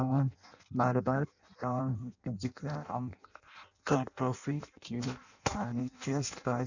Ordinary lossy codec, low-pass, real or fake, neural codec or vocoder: none; 7.2 kHz; fake; codec, 16 kHz in and 24 kHz out, 0.6 kbps, FireRedTTS-2 codec